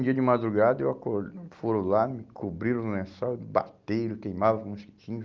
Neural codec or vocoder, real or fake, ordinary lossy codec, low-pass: none; real; Opus, 24 kbps; 7.2 kHz